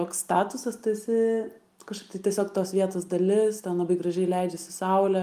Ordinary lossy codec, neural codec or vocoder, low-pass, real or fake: Opus, 32 kbps; none; 14.4 kHz; real